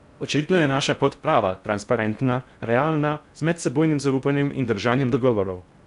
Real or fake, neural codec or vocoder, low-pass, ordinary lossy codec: fake; codec, 16 kHz in and 24 kHz out, 0.6 kbps, FocalCodec, streaming, 4096 codes; 10.8 kHz; none